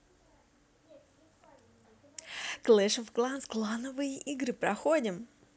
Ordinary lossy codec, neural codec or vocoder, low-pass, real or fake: none; none; none; real